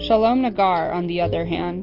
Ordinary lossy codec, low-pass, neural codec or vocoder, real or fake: Opus, 24 kbps; 5.4 kHz; none; real